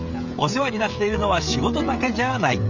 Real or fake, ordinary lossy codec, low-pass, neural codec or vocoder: fake; none; 7.2 kHz; codec, 16 kHz, 16 kbps, FreqCodec, smaller model